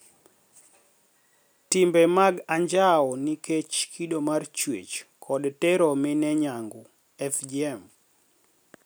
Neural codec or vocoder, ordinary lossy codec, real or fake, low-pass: none; none; real; none